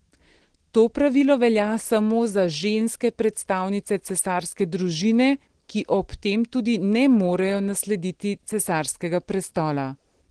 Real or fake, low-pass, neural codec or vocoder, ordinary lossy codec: real; 9.9 kHz; none; Opus, 16 kbps